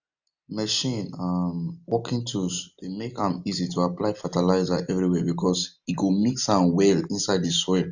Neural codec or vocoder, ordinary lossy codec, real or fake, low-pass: none; none; real; 7.2 kHz